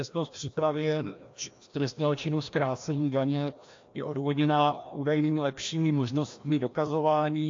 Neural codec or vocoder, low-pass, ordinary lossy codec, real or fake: codec, 16 kHz, 1 kbps, FreqCodec, larger model; 7.2 kHz; AAC, 48 kbps; fake